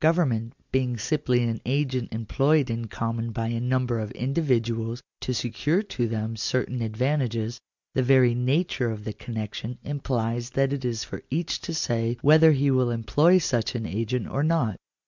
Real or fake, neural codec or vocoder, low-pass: real; none; 7.2 kHz